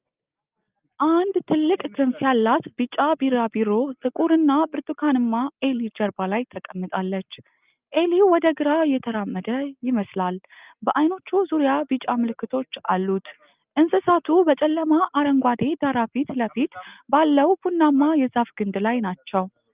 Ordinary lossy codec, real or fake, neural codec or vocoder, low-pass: Opus, 24 kbps; real; none; 3.6 kHz